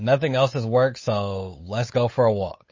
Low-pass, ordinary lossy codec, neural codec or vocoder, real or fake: 7.2 kHz; MP3, 32 kbps; codec, 16 kHz, 8 kbps, FunCodec, trained on Chinese and English, 25 frames a second; fake